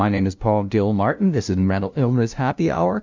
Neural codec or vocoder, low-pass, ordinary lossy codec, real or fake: codec, 16 kHz, 0.5 kbps, FunCodec, trained on LibriTTS, 25 frames a second; 7.2 kHz; MP3, 64 kbps; fake